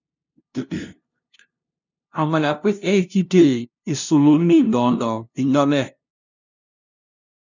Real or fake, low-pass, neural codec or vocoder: fake; 7.2 kHz; codec, 16 kHz, 0.5 kbps, FunCodec, trained on LibriTTS, 25 frames a second